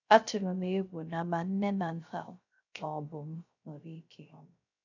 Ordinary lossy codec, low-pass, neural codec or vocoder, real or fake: none; 7.2 kHz; codec, 16 kHz, 0.3 kbps, FocalCodec; fake